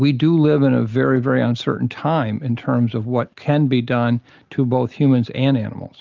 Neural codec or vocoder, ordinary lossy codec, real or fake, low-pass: none; Opus, 32 kbps; real; 7.2 kHz